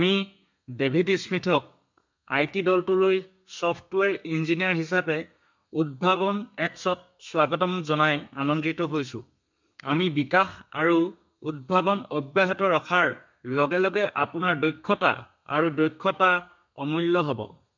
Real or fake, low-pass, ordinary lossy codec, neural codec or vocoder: fake; 7.2 kHz; MP3, 64 kbps; codec, 32 kHz, 1.9 kbps, SNAC